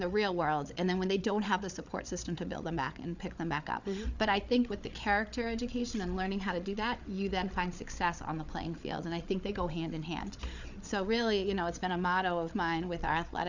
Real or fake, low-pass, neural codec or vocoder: fake; 7.2 kHz; codec, 16 kHz, 8 kbps, FreqCodec, larger model